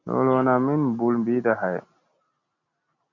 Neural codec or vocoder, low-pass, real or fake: none; 7.2 kHz; real